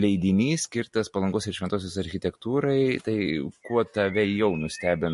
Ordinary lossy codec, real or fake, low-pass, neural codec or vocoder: MP3, 48 kbps; real; 14.4 kHz; none